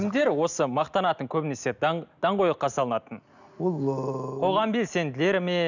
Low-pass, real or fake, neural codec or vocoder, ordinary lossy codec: 7.2 kHz; real; none; none